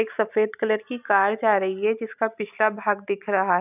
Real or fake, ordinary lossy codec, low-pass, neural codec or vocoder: real; none; 3.6 kHz; none